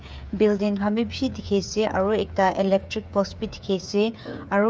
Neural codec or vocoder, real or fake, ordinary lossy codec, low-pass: codec, 16 kHz, 8 kbps, FreqCodec, smaller model; fake; none; none